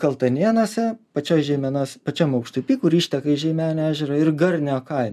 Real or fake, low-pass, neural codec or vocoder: real; 14.4 kHz; none